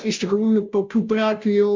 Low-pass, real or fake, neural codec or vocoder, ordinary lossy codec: 7.2 kHz; fake; codec, 16 kHz, 0.5 kbps, FunCodec, trained on Chinese and English, 25 frames a second; MP3, 64 kbps